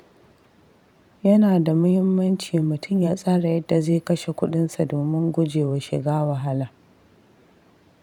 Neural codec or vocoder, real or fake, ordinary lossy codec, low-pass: vocoder, 44.1 kHz, 128 mel bands every 512 samples, BigVGAN v2; fake; none; 19.8 kHz